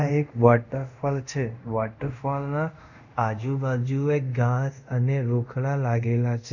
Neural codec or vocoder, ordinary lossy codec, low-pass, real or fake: codec, 24 kHz, 0.5 kbps, DualCodec; none; 7.2 kHz; fake